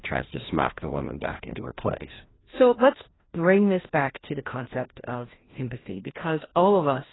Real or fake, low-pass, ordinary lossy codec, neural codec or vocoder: fake; 7.2 kHz; AAC, 16 kbps; codec, 16 kHz, 1 kbps, FreqCodec, larger model